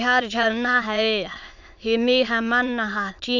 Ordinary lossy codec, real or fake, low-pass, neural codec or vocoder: none; fake; 7.2 kHz; autoencoder, 22.05 kHz, a latent of 192 numbers a frame, VITS, trained on many speakers